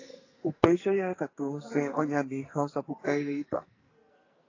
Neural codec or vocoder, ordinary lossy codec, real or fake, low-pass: codec, 44.1 kHz, 2.6 kbps, SNAC; AAC, 32 kbps; fake; 7.2 kHz